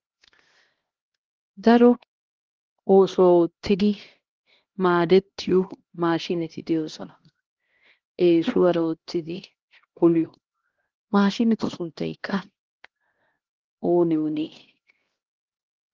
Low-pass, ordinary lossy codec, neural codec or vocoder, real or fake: 7.2 kHz; Opus, 16 kbps; codec, 16 kHz, 1 kbps, X-Codec, HuBERT features, trained on LibriSpeech; fake